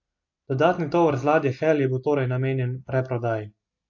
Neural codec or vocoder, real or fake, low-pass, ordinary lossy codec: none; real; 7.2 kHz; AAC, 48 kbps